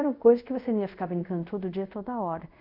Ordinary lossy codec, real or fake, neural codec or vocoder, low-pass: none; fake; codec, 24 kHz, 0.5 kbps, DualCodec; 5.4 kHz